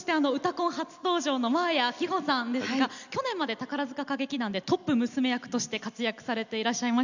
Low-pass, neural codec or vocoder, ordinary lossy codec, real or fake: 7.2 kHz; none; none; real